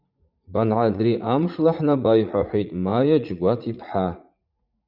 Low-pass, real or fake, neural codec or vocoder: 5.4 kHz; fake; vocoder, 44.1 kHz, 80 mel bands, Vocos